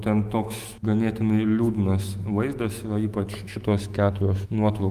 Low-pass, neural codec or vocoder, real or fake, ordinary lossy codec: 14.4 kHz; autoencoder, 48 kHz, 128 numbers a frame, DAC-VAE, trained on Japanese speech; fake; Opus, 32 kbps